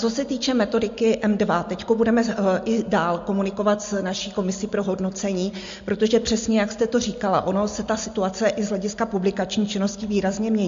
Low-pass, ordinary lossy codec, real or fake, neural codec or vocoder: 7.2 kHz; MP3, 48 kbps; real; none